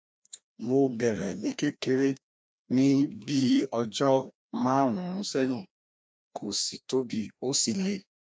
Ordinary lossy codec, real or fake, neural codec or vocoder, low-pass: none; fake; codec, 16 kHz, 1 kbps, FreqCodec, larger model; none